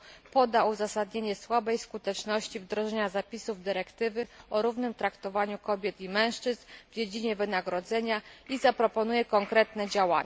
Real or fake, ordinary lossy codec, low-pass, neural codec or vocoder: real; none; none; none